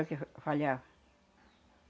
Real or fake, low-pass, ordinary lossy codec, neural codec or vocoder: real; none; none; none